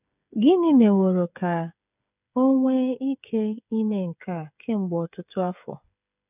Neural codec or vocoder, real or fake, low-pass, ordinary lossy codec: codec, 16 kHz, 8 kbps, FreqCodec, smaller model; fake; 3.6 kHz; none